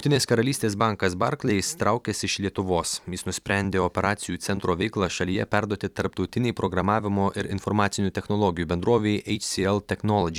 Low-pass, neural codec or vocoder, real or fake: 19.8 kHz; vocoder, 44.1 kHz, 128 mel bands every 256 samples, BigVGAN v2; fake